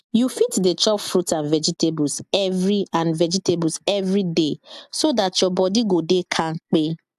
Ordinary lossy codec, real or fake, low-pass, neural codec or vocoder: AAC, 96 kbps; real; 14.4 kHz; none